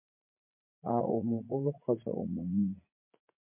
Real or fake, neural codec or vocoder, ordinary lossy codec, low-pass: fake; vocoder, 44.1 kHz, 80 mel bands, Vocos; MP3, 24 kbps; 3.6 kHz